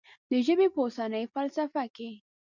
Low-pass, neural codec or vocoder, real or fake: 7.2 kHz; none; real